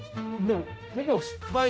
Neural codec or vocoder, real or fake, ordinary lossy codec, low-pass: codec, 16 kHz, 0.5 kbps, X-Codec, HuBERT features, trained on general audio; fake; none; none